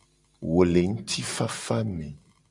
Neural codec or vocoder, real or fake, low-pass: none; real; 10.8 kHz